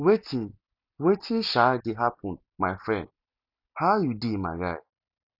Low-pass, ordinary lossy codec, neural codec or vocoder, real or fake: 5.4 kHz; none; none; real